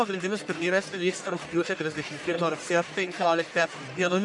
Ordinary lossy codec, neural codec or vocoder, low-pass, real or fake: MP3, 96 kbps; codec, 44.1 kHz, 1.7 kbps, Pupu-Codec; 10.8 kHz; fake